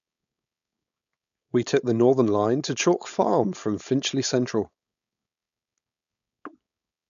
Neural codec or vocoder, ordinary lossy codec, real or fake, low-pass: codec, 16 kHz, 4.8 kbps, FACodec; none; fake; 7.2 kHz